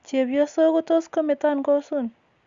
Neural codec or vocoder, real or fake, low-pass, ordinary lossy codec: none; real; 7.2 kHz; Opus, 64 kbps